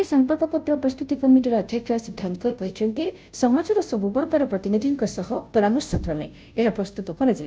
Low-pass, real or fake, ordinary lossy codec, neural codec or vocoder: none; fake; none; codec, 16 kHz, 0.5 kbps, FunCodec, trained on Chinese and English, 25 frames a second